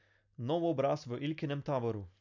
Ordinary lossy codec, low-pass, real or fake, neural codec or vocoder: none; 7.2 kHz; real; none